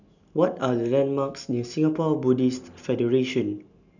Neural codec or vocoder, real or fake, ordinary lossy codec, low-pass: none; real; none; 7.2 kHz